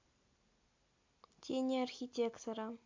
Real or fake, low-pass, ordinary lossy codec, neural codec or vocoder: real; 7.2 kHz; MP3, 48 kbps; none